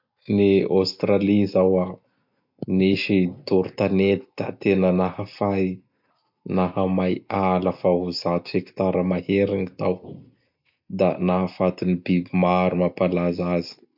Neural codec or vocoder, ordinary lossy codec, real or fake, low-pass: none; MP3, 48 kbps; real; 5.4 kHz